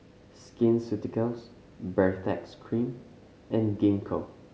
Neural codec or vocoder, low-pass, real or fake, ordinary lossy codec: none; none; real; none